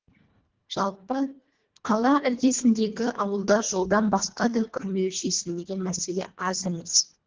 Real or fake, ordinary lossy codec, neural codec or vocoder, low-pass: fake; Opus, 16 kbps; codec, 24 kHz, 1.5 kbps, HILCodec; 7.2 kHz